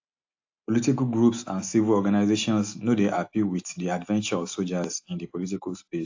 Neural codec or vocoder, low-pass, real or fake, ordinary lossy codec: none; 7.2 kHz; real; AAC, 48 kbps